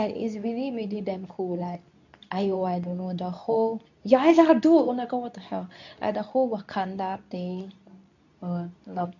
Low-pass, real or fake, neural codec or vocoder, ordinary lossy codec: 7.2 kHz; fake; codec, 24 kHz, 0.9 kbps, WavTokenizer, medium speech release version 2; none